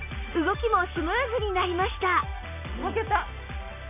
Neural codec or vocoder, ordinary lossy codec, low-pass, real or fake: none; none; 3.6 kHz; real